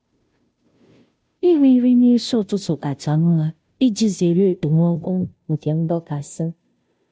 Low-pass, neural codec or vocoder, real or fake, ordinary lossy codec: none; codec, 16 kHz, 0.5 kbps, FunCodec, trained on Chinese and English, 25 frames a second; fake; none